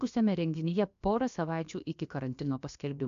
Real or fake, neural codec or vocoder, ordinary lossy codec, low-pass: fake; codec, 16 kHz, about 1 kbps, DyCAST, with the encoder's durations; AAC, 64 kbps; 7.2 kHz